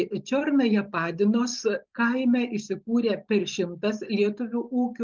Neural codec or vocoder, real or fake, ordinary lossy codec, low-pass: none; real; Opus, 32 kbps; 7.2 kHz